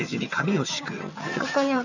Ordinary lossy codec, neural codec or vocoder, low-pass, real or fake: none; vocoder, 22.05 kHz, 80 mel bands, HiFi-GAN; 7.2 kHz; fake